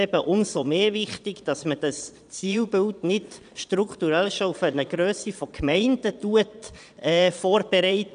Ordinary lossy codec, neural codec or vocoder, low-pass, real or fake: none; vocoder, 22.05 kHz, 80 mel bands, Vocos; 9.9 kHz; fake